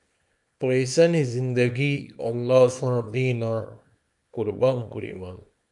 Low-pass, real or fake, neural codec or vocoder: 10.8 kHz; fake; codec, 24 kHz, 0.9 kbps, WavTokenizer, small release